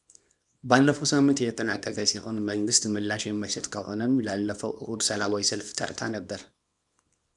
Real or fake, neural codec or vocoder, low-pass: fake; codec, 24 kHz, 0.9 kbps, WavTokenizer, small release; 10.8 kHz